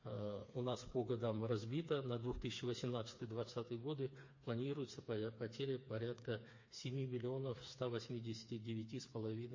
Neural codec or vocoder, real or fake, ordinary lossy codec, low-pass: codec, 16 kHz, 4 kbps, FreqCodec, smaller model; fake; MP3, 32 kbps; 7.2 kHz